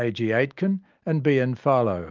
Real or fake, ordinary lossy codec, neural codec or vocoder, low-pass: real; Opus, 24 kbps; none; 7.2 kHz